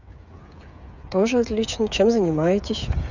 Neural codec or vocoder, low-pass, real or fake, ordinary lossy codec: codec, 16 kHz, 8 kbps, FreqCodec, smaller model; 7.2 kHz; fake; none